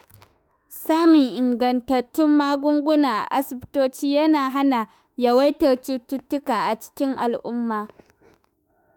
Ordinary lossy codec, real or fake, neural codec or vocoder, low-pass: none; fake; autoencoder, 48 kHz, 32 numbers a frame, DAC-VAE, trained on Japanese speech; none